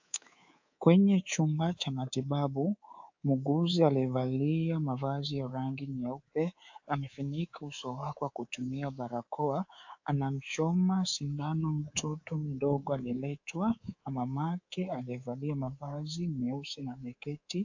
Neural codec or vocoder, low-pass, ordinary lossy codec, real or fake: codec, 24 kHz, 3.1 kbps, DualCodec; 7.2 kHz; AAC, 48 kbps; fake